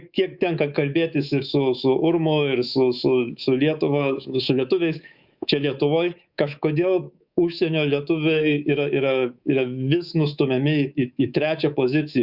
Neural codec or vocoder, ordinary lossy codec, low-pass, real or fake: codec, 24 kHz, 3.1 kbps, DualCodec; Opus, 64 kbps; 5.4 kHz; fake